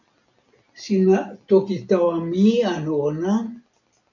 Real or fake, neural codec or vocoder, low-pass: real; none; 7.2 kHz